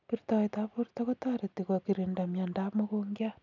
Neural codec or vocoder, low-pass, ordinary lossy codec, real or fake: none; 7.2 kHz; none; real